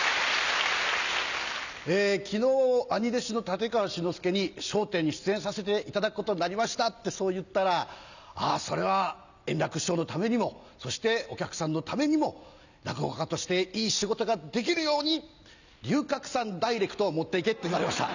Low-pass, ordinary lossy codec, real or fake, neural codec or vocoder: 7.2 kHz; none; real; none